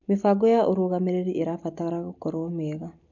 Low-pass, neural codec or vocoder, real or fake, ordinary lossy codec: 7.2 kHz; none; real; none